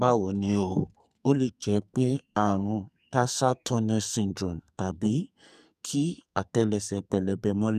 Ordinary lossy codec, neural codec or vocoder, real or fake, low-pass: none; codec, 44.1 kHz, 2.6 kbps, SNAC; fake; 14.4 kHz